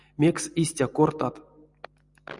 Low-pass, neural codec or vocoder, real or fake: 10.8 kHz; none; real